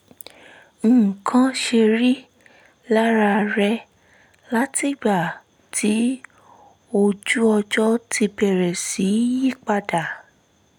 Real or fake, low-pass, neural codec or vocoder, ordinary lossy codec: real; 19.8 kHz; none; none